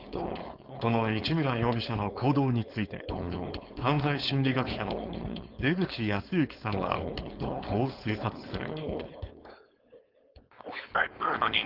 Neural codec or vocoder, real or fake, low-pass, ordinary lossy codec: codec, 16 kHz, 4.8 kbps, FACodec; fake; 5.4 kHz; Opus, 24 kbps